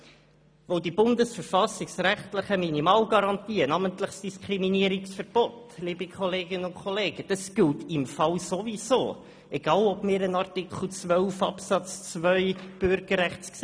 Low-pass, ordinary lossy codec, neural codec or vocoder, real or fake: none; none; none; real